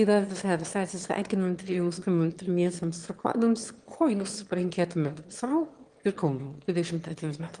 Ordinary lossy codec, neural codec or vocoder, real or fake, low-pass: Opus, 24 kbps; autoencoder, 22.05 kHz, a latent of 192 numbers a frame, VITS, trained on one speaker; fake; 9.9 kHz